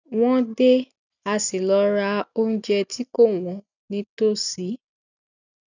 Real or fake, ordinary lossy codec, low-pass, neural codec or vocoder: real; none; 7.2 kHz; none